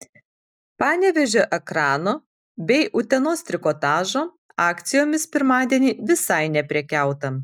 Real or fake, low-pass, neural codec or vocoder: real; 19.8 kHz; none